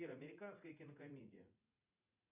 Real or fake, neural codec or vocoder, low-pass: fake; vocoder, 44.1 kHz, 80 mel bands, Vocos; 3.6 kHz